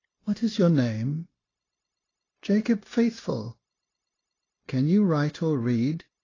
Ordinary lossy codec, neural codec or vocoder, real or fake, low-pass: AAC, 32 kbps; codec, 16 kHz, 0.9 kbps, LongCat-Audio-Codec; fake; 7.2 kHz